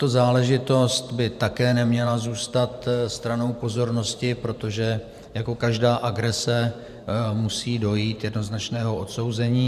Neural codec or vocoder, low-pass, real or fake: vocoder, 44.1 kHz, 128 mel bands every 512 samples, BigVGAN v2; 14.4 kHz; fake